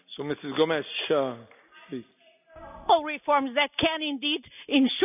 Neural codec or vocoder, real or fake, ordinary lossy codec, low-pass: none; real; none; 3.6 kHz